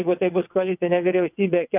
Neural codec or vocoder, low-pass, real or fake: vocoder, 22.05 kHz, 80 mel bands, WaveNeXt; 3.6 kHz; fake